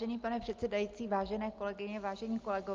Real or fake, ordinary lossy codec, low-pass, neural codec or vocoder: real; Opus, 32 kbps; 7.2 kHz; none